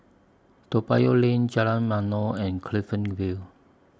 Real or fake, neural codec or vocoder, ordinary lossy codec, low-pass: real; none; none; none